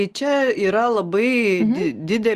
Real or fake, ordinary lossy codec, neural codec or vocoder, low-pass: real; Opus, 32 kbps; none; 14.4 kHz